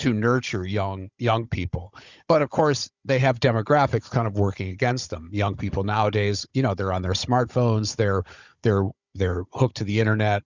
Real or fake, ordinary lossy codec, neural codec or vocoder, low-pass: fake; Opus, 64 kbps; codec, 16 kHz, 16 kbps, FunCodec, trained on Chinese and English, 50 frames a second; 7.2 kHz